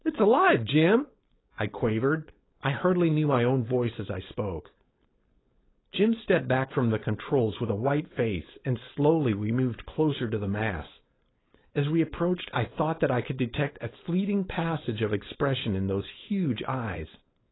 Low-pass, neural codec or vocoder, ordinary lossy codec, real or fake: 7.2 kHz; codec, 16 kHz, 4.8 kbps, FACodec; AAC, 16 kbps; fake